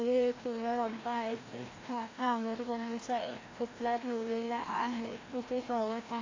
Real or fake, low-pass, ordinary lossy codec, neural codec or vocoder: fake; 7.2 kHz; AAC, 32 kbps; codec, 16 kHz, 1 kbps, FreqCodec, larger model